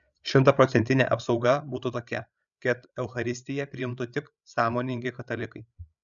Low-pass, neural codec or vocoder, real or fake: 7.2 kHz; codec, 16 kHz, 8 kbps, FreqCodec, larger model; fake